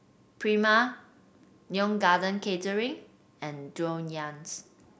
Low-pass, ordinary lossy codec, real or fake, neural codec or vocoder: none; none; real; none